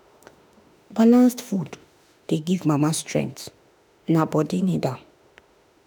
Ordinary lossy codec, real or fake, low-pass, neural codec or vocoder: none; fake; none; autoencoder, 48 kHz, 32 numbers a frame, DAC-VAE, trained on Japanese speech